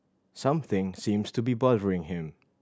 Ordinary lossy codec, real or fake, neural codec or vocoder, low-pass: none; real; none; none